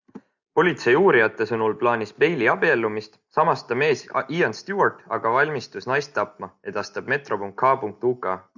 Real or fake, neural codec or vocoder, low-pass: real; none; 7.2 kHz